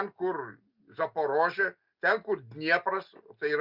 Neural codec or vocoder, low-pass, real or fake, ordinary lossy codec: none; 5.4 kHz; real; Opus, 64 kbps